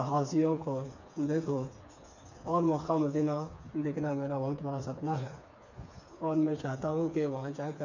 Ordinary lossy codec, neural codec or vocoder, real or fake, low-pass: none; codec, 16 kHz, 4 kbps, FreqCodec, smaller model; fake; 7.2 kHz